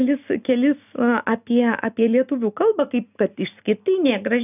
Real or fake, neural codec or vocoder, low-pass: real; none; 3.6 kHz